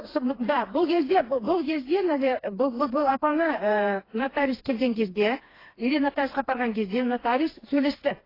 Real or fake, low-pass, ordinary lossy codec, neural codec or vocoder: fake; 5.4 kHz; AAC, 24 kbps; codec, 16 kHz, 2 kbps, FreqCodec, smaller model